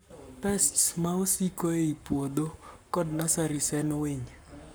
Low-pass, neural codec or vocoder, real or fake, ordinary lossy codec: none; codec, 44.1 kHz, 7.8 kbps, Pupu-Codec; fake; none